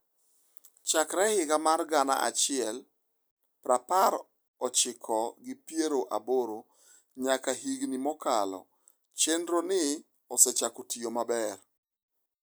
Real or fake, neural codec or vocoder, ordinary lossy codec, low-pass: real; none; none; none